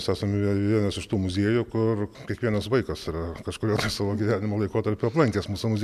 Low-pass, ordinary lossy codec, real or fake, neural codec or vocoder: 14.4 kHz; MP3, 96 kbps; real; none